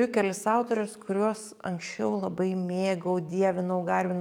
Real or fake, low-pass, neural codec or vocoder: fake; 19.8 kHz; codec, 44.1 kHz, 7.8 kbps, DAC